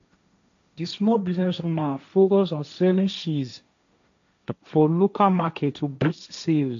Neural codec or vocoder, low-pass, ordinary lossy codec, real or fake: codec, 16 kHz, 1.1 kbps, Voila-Tokenizer; 7.2 kHz; AAC, 64 kbps; fake